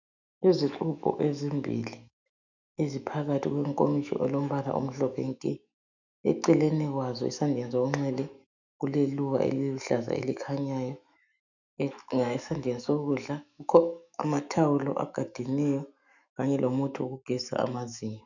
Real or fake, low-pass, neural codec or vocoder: fake; 7.2 kHz; autoencoder, 48 kHz, 128 numbers a frame, DAC-VAE, trained on Japanese speech